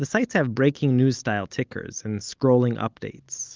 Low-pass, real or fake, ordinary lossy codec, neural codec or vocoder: 7.2 kHz; real; Opus, 32 kbps; none